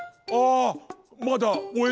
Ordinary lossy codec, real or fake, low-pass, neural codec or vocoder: none; real; none; none